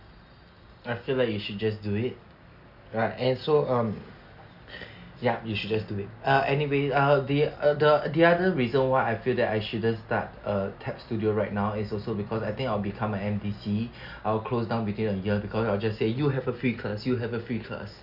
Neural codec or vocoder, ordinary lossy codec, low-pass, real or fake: none; none; 5.4 kHz; real